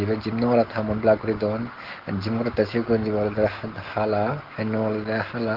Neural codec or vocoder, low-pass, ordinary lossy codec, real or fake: none; 5.4 kHz; Opus, 16 kbps; real